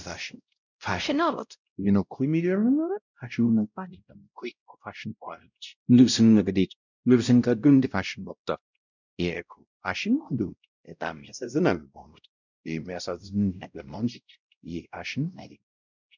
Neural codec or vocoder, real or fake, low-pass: codec, 16 kHz, 0.5 kbps, X-Codec, WavLM features, trained on Multilingual LibriSpeech; fake; 7.2 kHz